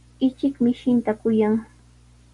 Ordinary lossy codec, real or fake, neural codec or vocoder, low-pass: Opus, 64 kbps; real; none; 10.8 kHz